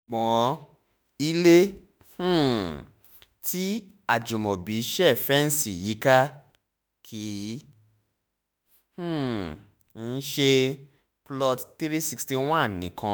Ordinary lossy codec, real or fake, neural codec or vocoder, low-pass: none; fake; autoencoder, 48 kHz, 32 numbers a frame, DAC-VAE, trained on Japanese speech; none